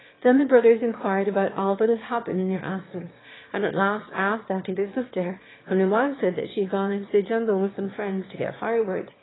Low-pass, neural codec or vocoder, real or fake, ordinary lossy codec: 7.2 kHz; autoencoder, 22.05 kHz, a latent of 192 numbers a frame, VITS, trained on one speaker; fake; AAC, 16 kbps